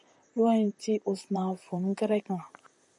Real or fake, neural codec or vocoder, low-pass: fake; vocoder, 44.1 kHz, 128 mel bands, Pupu-Vocoder; 10.8 kHz